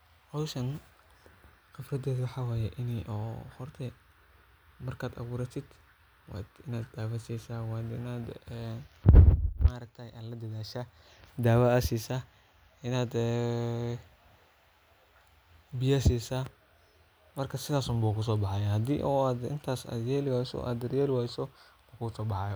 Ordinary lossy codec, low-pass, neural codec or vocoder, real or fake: none; none; none; real